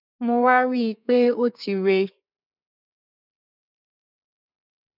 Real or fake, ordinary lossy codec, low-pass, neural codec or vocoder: fake; none; 5.4 kHz; codec, 32 kHz, 1.9 kbps, SNAC